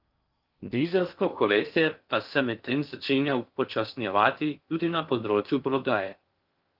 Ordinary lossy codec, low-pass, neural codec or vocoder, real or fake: Opus, 32 kbps; 5.4 kHz; codec, 16 kHz in and 24 kHz out, 0.6 kbps, FocalCodec, streaming, 4096 codes; fake